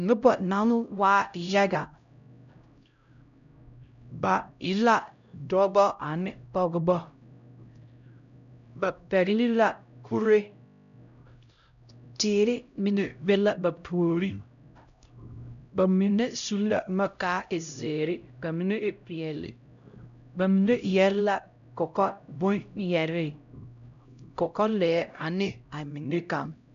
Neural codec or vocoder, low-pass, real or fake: codec, 16 kHz, 0.5 kbps, X-Codec, HuBERT features, trained on LibriSpeech; 7.2 kHz; fake